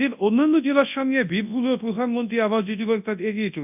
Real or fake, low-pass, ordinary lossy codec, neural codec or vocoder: fake; 3.6 kHz; none; codec, 24 kHz, 0.9 kbps, WavTokenizer, large speech release